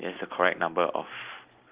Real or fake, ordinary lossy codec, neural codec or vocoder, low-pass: real; Opus, 32 kbps; none; 3.6 kHz